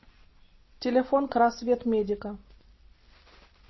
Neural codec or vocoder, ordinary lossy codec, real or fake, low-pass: none; MP3, 24 kbps; real; 7.2 kHz